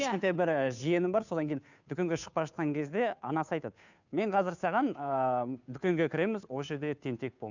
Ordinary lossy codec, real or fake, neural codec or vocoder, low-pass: none; fake; codec, 16 kHz, 2 kbps, FunCodec, trained on Chinese and English, 25 frames a second; 7.2 kHz